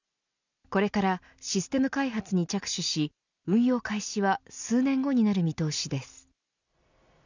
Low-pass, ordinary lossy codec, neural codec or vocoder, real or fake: 7.2 kHz; none; none; real